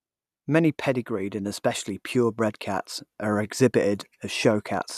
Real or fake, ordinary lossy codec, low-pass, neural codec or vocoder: real; none; 14.4 kHz; none